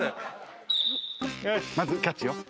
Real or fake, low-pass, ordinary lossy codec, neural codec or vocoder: real; none; none; none